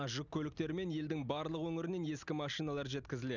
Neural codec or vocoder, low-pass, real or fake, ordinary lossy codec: none; 7.2 kHz; real; none